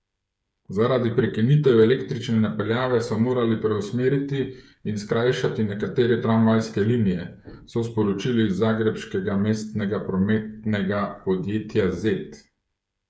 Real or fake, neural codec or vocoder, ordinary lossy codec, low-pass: fake; codec, 16 kHz, 8 kbps, FreqCodec, smaller model; none; none